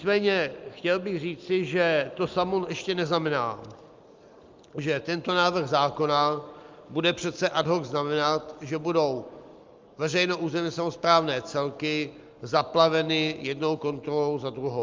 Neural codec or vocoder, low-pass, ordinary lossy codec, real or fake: none; 7.2 kHz; Opus, 24 kbps; real